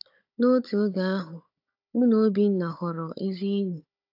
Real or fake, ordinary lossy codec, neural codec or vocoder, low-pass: fake; AAC, 48 kbps; codec, 16 kHz, 16 kbps, FunCodec, trained on Chinese and English, 50 frames a second; 5.4 kHz